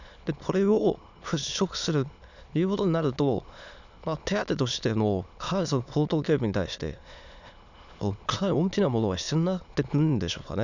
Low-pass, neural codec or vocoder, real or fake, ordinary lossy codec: 7.2 kHz; autoencoder, 22.05 kHz, a latent of 192 numbers a frame, VITS, trained on many speakers; fake; none